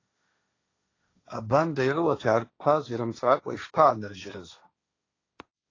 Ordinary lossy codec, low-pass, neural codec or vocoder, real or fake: AAC, 32 kbps; 7.2 kHz; codec, 16 kHz, 1.1 kbps, Voila-Tokenizer; fake